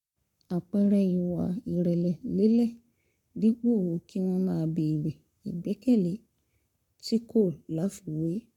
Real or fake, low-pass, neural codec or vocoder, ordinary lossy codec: fake; 19.8 kHz; codec, 44.1 kHz, 7.8 kbps, Pupu-Codec; none